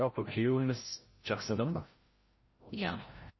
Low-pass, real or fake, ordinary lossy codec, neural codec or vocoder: 7.2 kHz; fake; MP3, 24 kbps; codec, 16 kHz, 0.5 kbps, FreqCodec, larger model